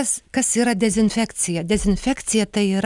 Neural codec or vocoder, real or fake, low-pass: none; real; 10.8 kHz